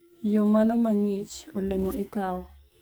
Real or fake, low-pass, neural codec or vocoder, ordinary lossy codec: fake; none; codec, 44.1 kHz, 2.6 kbps, SNAC; none